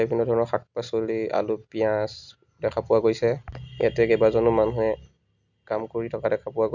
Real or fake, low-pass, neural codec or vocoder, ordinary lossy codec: real; 7.2 kHz; none; none